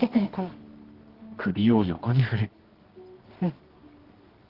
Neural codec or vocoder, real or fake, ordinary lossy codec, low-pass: codec, 24 kHz, 0.9 kbps, WavTokenizer, medium music audio release; fake; Opus, 16 kbps; 5.4 kHz